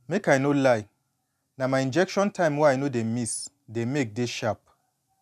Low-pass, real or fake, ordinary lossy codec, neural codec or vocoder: 14.4 kHz; real; none; none